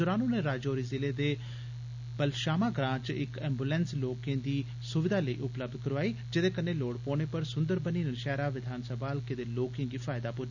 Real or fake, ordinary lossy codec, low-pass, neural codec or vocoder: real; none; 7.2 kHz; none